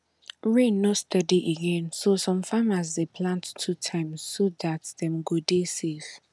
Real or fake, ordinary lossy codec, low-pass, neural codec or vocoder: real; none; none; none